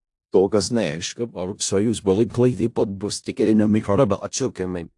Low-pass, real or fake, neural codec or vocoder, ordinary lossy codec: 10.8 kHz; fake; codec, 16 kHz in and 24 kHz out, 0.4 kbps, LongCat-Audio-Codec, four codebook decoder; AAC, 64 kbps